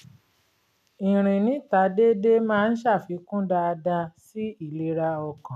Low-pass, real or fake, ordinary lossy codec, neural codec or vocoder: 14.4 kHz; real; none; none